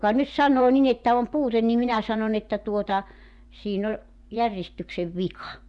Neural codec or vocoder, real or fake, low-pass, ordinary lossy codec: vocoder, 24 kHz, 100 mel bands, Vocos; fake; 10.8 kHz; none